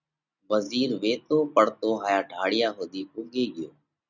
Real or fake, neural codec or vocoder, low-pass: real; none; 7.2 kHz